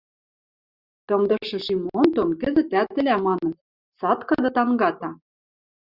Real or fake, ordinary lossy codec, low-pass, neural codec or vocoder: real; Opus, 64 kbps; 5.4 kHz; none